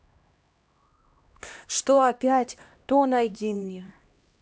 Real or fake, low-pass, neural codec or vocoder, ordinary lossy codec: fake; none; codec, 16 kHz, 1 kbps, X-Codec, HuBERT features, trained on LibriSpeech; none